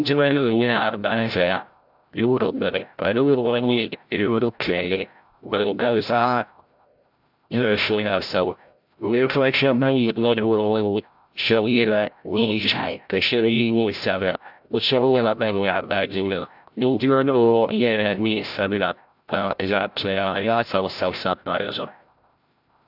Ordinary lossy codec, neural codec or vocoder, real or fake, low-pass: AAC, 48 kbps; codec, 16 kHz, 0.5 kbps, FreqCodec, larger model; fake; 5.4 kHz